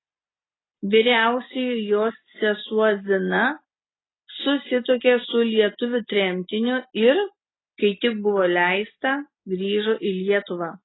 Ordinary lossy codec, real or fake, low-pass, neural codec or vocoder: AAC, 16 kbps; real; 7.2 kHz; none